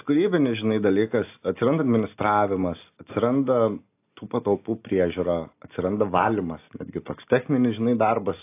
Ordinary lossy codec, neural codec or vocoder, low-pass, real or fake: AAC, 24 kbps; none; 3.6 kHz; real